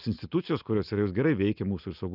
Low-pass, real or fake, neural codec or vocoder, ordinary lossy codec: 5.4 kHz; real; none; Opus, 24 kbps